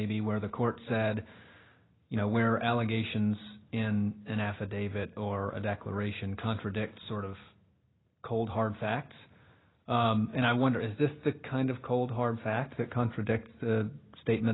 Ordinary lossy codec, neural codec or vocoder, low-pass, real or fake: AAC, 16 kbps; none; 7.2 kHz; real